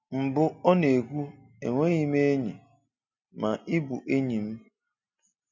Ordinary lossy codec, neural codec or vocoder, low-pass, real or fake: none; none; 7.2 kHz; real